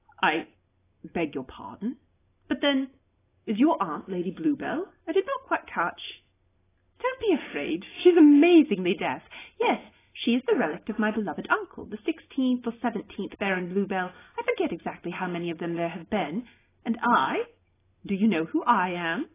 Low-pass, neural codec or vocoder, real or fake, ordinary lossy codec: 3.6 kHz; none; real; AAC, 16 kbps